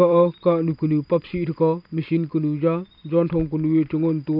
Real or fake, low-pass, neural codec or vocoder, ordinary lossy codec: fake; 5.4 kHz; vocoder, 44.1 kHz, 128 mel bands every 256 samples, BigVGAN v2; none